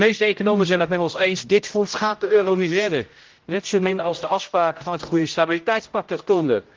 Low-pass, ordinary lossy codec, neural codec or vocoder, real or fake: 7.2 kHz; Opus, 32 kbps; codec, 16 kHz, 0.5 kbps, X-Codec, HuBERT features, trained on general audio; fake